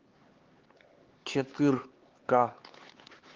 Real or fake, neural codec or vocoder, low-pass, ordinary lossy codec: fake; codec, 16 kHz, 4 kbps, X-Codec, HuBERT features, trained on LibriSpeech; 7.2 kHz; Opus, 16 kbps